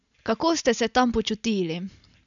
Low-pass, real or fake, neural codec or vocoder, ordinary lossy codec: 7.2 kHz; real; none; none